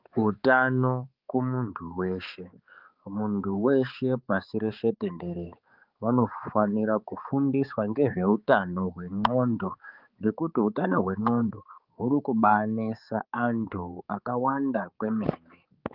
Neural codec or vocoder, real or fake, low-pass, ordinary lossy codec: codec, 16 kHz, 4 kbps, X-Codec, HuBERT features, trained on balanced general audio; fake; 5.4 kHz; Opus, 32 kbps